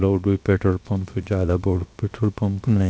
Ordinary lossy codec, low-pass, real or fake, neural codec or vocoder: none; none; fake; codec, 16 kHz, 0.7 kbps, FocalCodec